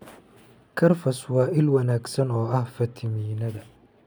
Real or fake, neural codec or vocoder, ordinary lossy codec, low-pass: real; none; none; none